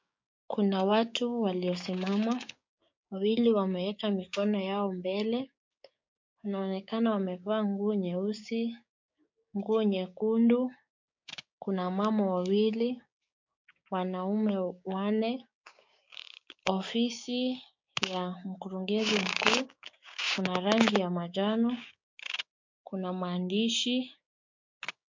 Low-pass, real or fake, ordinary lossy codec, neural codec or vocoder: 7.2 kHz; fake; MP3, 48 kbps; codec, 16 kHz, 6 kbps, DAC